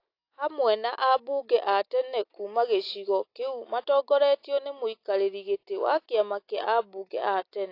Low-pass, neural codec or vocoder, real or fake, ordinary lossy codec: 5.4 kHz; none; real; AAC, 32 kbps